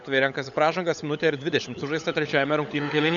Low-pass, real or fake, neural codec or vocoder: 7.2 kHz; fake; codec, 16 kHz, 16 kbps, FunCodec, trained on LibriTTS, 50 frames a second